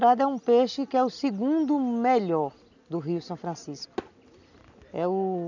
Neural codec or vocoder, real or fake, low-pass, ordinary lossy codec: none; real; 7.2 kHz; none